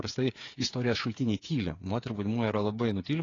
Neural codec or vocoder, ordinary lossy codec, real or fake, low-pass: codec, 16 kHz, 8 kbps, FreqCodec, smaller model; AAC, 32 kbps; fake; 7.2 kHz